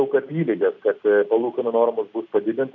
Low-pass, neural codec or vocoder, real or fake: 7.2 kHz; none; real